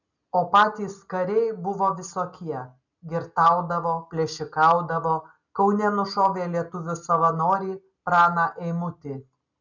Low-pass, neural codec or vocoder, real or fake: 7.2 kHz; none; real